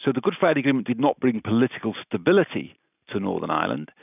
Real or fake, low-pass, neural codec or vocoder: real; 3.6 kHz; none